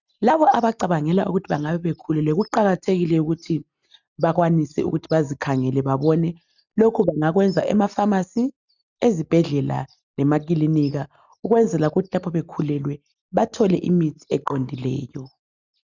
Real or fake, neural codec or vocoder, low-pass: real; none; 7.2 kHz